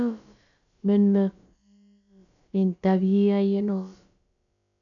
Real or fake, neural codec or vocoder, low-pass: fake; codec, 16 kHz, about 1 kbps, DyCAST, with the encoder's durations; 7.2 kHz